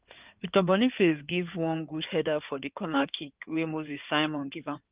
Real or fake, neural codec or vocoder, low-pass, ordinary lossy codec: fake; codec, 16 kHz in and 24 kHz out, 2.2 kbps, FireRedTTS-2 codec; 3.6 kHz; Opus, 24 kbps